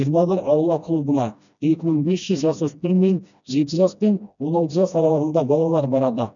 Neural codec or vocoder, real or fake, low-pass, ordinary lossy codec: codec, 16 kHz, 1 kbps, FreqCodec, smaller model; fake; 7.2 kHz; none